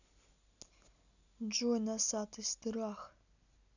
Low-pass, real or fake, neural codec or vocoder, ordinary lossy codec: 7.2 kHz; real; none; none